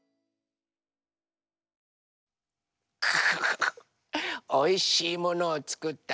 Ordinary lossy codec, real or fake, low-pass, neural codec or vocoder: none; real; none; none